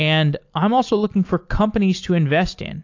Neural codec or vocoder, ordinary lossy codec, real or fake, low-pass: none; AAC, 48 kbps; real; 7.2 kHz